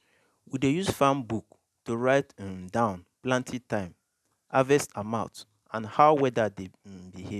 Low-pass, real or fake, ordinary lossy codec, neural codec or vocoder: 14.4 kHz; real; none; none